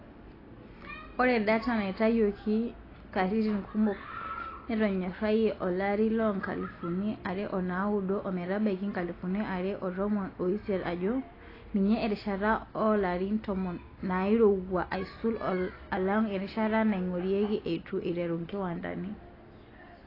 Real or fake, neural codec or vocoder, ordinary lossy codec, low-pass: real; none; AAC, 24 kbps; 5.4 kHz